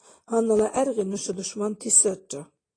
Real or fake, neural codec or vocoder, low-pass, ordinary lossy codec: real; none; 9.9 kHz; AAC, 32 kbps